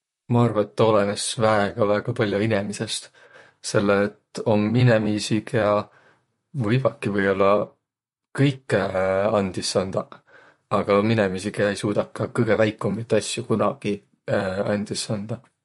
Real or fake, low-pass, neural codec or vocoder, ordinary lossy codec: fake; 14.4 kHz; vocoder, 44.1 kHz, 128 mel bands, Pupu-Vocoder; MP3, 48 kbps